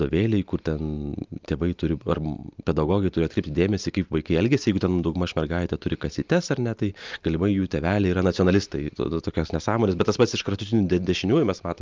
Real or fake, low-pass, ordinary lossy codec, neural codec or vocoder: real; 7.2 kHz; Opus, 24 kbps; none